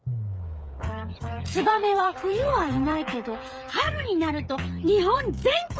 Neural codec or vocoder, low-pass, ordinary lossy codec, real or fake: codec, 16 kHz, 8 kbps, FreqCodec, smaller model; none; none; fake